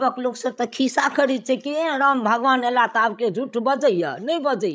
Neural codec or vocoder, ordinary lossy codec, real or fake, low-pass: codec, 16 kHz, 16 kbps, FreqCodec, larger model; none; fake; none